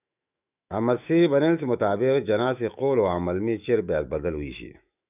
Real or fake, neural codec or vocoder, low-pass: fake; autoencoder, 48 kHz, 128 numbers a frame, DAC-VAE, trained on Japanese speech; 3.6 kHz